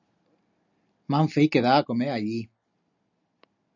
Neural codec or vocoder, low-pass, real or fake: none; 7.2 kHz; real